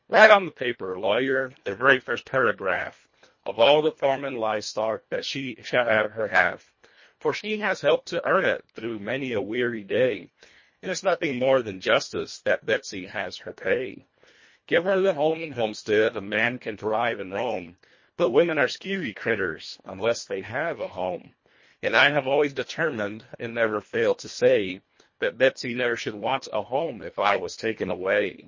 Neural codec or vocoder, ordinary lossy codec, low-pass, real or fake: codec, 24 kHz, 1.5 kbps, HILCodec; MP3, 32 kbps; 7.2 kHz; fake